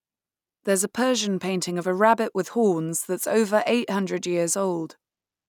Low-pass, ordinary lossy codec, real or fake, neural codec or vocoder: 19.8 kHz; none; real; none